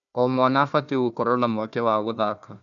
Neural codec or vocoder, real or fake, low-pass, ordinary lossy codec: codec, 16 kHz, 1 kbps, FunCodec, trained on Chinese and English, 50 frames a second; fake; 7.2 kHz; none